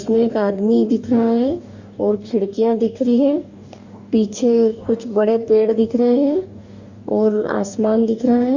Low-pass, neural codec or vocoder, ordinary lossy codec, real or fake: 7.2 kHz; codec, 44.1 kHz, 2.6 kbps, DAC; Opus, 64 kbps; fake